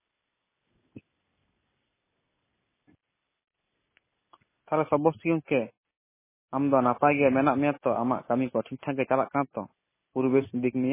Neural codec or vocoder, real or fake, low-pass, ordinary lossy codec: none; real; 3.6 kHz; MP3, 16 kbps